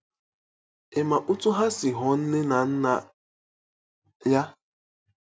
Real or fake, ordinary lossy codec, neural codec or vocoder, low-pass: real; none; none; none